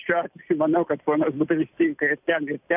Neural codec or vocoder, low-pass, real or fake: none; 3.6 kHz; real